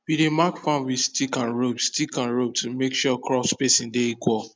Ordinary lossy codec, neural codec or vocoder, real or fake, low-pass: none; none; real; none